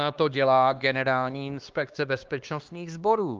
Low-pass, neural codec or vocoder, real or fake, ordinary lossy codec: 7.2 kHz; codec, 16 kHz, 2 kbps, X-Codec, HuBERT features, trained on LibriSpeech; fake; Opus, 24 kbps